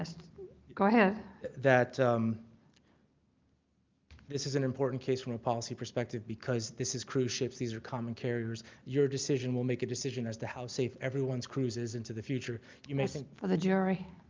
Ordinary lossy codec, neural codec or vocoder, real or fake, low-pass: Opus, 24 kbps; none; real; 7.2 kHz